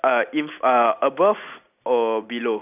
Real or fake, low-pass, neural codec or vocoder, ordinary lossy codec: real; 3.6 kHz; none; none